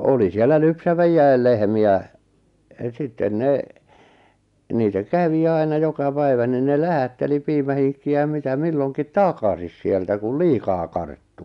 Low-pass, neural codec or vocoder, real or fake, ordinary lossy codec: 10.8 kHz; none; real; none